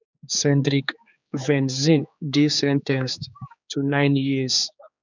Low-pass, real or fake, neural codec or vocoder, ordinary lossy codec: 7.2 kHz; fake; codec, 16 kHz, 4 kbps, X-Codec, HuBERT features, trained on general audio; none